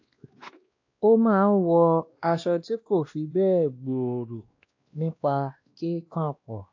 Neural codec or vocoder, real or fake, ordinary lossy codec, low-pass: codec, 16 kHz, 1 kbps, X-Codec, WavLM features, trained on Multilingual LibriSpeech; fake; AAC, 48 kbps; 7.2 kHz